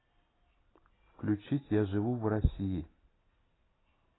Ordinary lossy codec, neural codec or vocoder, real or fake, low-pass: AAC, 16 kbps; none; real; 7.2 kHz